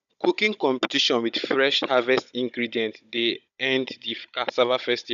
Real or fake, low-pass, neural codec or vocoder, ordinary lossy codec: fake; 7.2 kHz; codec, 16 kHz, 16 kbps, FunCodec, trained on Chinese and English, 50 frames a second; none